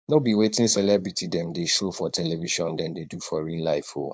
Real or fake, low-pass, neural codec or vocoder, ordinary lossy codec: fake; none; codec, 16 kHz, 4.8 kbps, FACodec; none